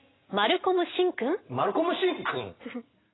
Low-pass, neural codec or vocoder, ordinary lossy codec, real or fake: 7.2 kHz; none; AAC, 16 kbps; real